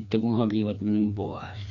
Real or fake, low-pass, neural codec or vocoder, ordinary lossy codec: fake; 7.2 kHz; codec, 16 kHz, 2 kbps, FreqCodec, larger model; none